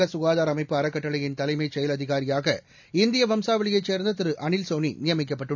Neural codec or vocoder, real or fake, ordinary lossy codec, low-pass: none; real; none; 7.2 kHz